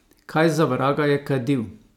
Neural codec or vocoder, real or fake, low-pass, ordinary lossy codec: none; real; 19.8 kHz; none